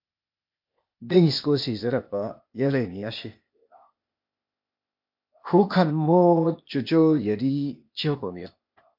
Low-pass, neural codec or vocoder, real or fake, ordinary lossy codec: 5.4 kHz; codec, 16 kHz, 0.8 kbps, ZipCodec; fake; MP3, 48 kbps